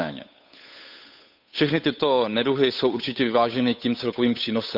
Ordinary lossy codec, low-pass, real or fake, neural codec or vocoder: none; 5.4 kHz; fake; codec, 16 kHz, 8 kbps, FunCodec, trained on Chinese and English, 25 frames a second